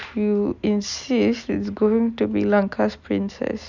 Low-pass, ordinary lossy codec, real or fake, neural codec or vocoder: 7.2 kHz; none; real; none